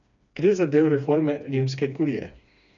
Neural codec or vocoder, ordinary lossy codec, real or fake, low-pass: codec, 16 kHz, 2 kbps, FreqCodec, smaller model; none; fake; 7.2 kHz